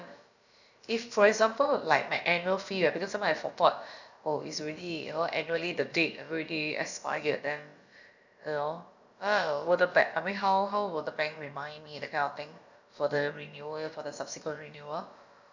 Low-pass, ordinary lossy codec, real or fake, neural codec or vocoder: 7.2 kHz; none; fake; codec, 16 kHz, about 1 kbps, DyCAST, with the encoder's durations